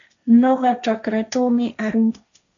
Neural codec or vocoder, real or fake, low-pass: codec, 16 kHz, 1.1 kbps, Voila-Tokenizer; fake; 7.2 kHz